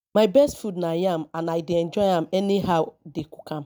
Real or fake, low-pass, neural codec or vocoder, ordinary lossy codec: real; none; none; none